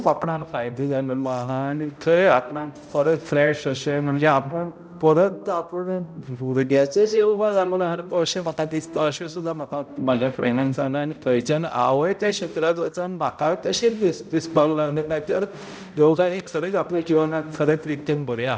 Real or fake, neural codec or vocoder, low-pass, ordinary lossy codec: fake; codec, 16 kHz, 0.5 kbps, X-Codec, HuBERT features, trained on balanced general audio; none; none